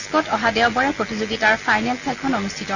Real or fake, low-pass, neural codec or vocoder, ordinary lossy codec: real; 7.2 kHz; none; none